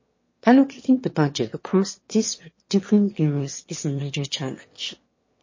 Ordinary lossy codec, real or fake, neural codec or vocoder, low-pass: MP3, 32 kbps; fake; autoencoder, 22.05 kHz, a latent of 192 numbers a frame, VITS, trained on one speaker; 7.2 kHz